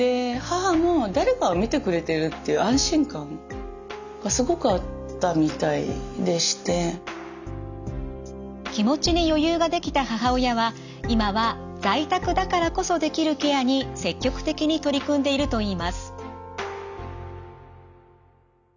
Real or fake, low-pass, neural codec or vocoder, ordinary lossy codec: real; 7.2 kHz; none; none